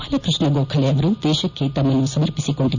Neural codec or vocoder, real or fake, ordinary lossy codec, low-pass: none; real; none; 7.2 kHz